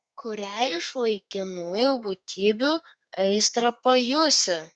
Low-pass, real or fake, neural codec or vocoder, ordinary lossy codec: 9.9 kHz; fake; codec, 32 kHz, 1.9 kbps, SNAC; Opus, 64 kbps